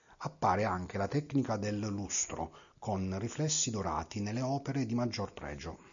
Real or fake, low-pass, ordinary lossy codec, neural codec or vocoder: real; 7.2 kHz; MP3, 96 kbps; none